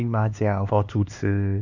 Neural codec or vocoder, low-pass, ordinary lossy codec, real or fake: codec, 16 kHz, 2 kbps, X-Codec, HuBERT features, trained on LibriSpeech; 7.2 kHz; none; fake